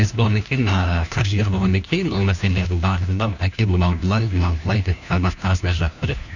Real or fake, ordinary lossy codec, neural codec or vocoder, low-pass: fake; none; codec, 16 kHz, 1 kbps, FunCodec, trained on LibriTTS, 50 frames a second; 7.2 kHz